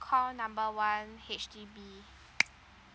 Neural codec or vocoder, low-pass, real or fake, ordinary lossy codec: none; none; real; none